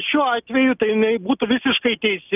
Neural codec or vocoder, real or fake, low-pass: none; real; 3.6 kHz